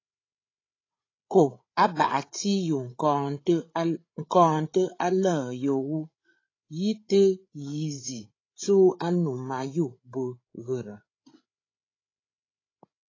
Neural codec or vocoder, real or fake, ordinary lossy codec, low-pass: codec, 16 kHz, 8 kbps, FreqCodec, larger model; fake; AAC, 32 kbps; 7.2 kHz